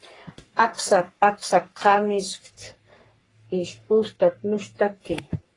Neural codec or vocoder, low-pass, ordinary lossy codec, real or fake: codec, 44.1 kHz, 3.4 kbps, Pupu-Codec; 10.8 kHz; AAC, 32 kbps; fake